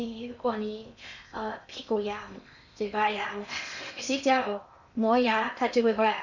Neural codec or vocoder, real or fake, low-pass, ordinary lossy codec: codec, 16 kHz in and 24 kHz out, 0.8 kbps, FocalCodec, streaming, 65536 codes; fake; 7.2 kHz; none